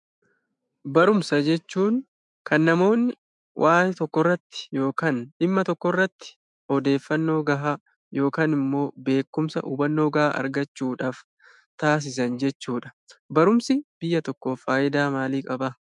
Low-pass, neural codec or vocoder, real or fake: 10.8 kHz; autoencoder, 48 kHz, 128 numbers a frame, DAC-VAE, trained on Japanese speech; fake